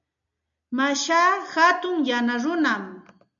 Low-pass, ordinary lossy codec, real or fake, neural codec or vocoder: 7.2 kHz; MP3, 96 kbps; real; none